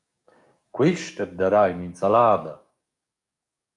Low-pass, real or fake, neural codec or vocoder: 10.8 kHz; fake; codec, 44.1 kHz, 7.8 kbps, DAC